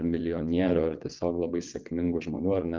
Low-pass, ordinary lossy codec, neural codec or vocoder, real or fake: 7.2 kHz; Opus, 32 kbps; vocoder, 22.05 kHz, 80 mel bands, WaveNeXt; fake